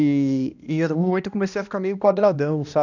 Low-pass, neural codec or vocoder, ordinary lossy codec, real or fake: 7.2 kHz; codec, 16 kHz, 1 kbps, X-Codec, HuBERT features, trained on balanced general audio; none; fake